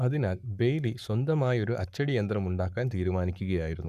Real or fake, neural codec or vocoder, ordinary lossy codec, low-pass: fake; codec, 44.1 kHz, 7.8 kbps, Pupu-Codec; none; 14.4 kHz